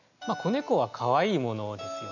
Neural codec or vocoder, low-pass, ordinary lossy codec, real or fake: none; 7.2 kHz; none; real